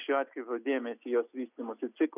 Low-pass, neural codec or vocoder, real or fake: 3.6 kHz; none; real